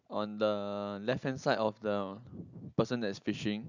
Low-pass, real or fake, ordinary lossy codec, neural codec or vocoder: 7.2 kHz; real; none; none